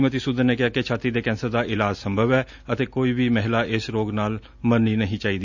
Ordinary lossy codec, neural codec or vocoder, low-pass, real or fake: none; none; 7.2 kHz; real